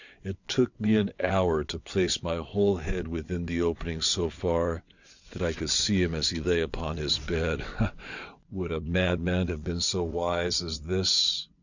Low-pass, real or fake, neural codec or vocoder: 7.2 kHz; fake; vocoder, 22.05 kHz, 80 mel bands, WaveNeXt